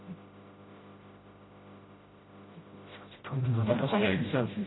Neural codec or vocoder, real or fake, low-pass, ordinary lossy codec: codec, 16 kHz, 0.5 kbps, FreqCodec, smaller model; fake; 7.2 kHz; AAC, 16 kbps